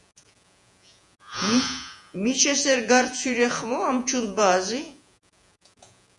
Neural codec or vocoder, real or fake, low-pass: vocoder, 48 kHz, 128 mel bands, Vocos; fake; 10.8 kHz